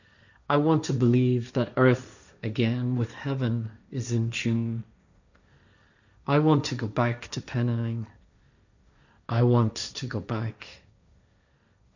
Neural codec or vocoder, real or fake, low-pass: codec, 16 kHz, 1.1 kbps, Voila-Tokenizer; fake; 7.2 kHz